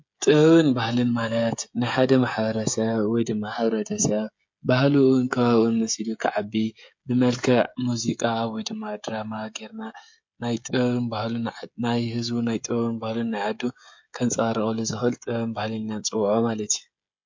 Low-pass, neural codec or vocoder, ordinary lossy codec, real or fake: 7.2 kHz; codec, 16 kHz, 16 kbps, FreqCodec, smaller model; MP3, 48 kbps; fake